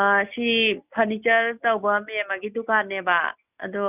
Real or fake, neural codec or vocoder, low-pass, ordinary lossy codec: real; none; 3.6 kHz; none